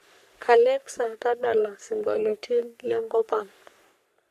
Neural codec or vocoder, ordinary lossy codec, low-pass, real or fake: codec, 44.1 kHz, 3.4 kbps, Pupu-Codec; none; 14.4 kHz; fake